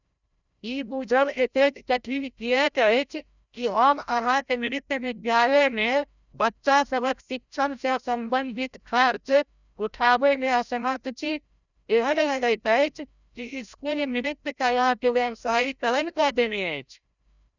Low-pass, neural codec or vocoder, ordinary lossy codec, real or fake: 7.2 kHz; codec, 16 kHz, 0.5 kbps, FreqCodec, larger model; none; fake